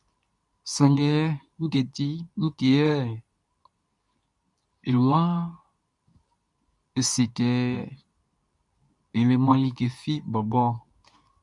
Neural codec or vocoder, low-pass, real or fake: codec, 24 kHz, 0.9 kbps, WavTokenizer, medium speech release version 2; 10.8 kHz; fake